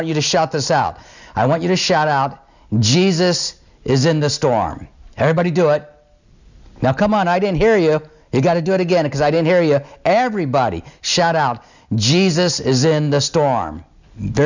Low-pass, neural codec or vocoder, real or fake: 7.2 kHz; none; real